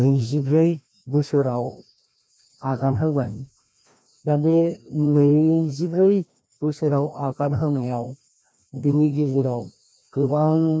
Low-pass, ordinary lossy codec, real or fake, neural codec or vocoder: none; none; fake; codec, 16 kHz, 1 kbps, FreqCodec, larger model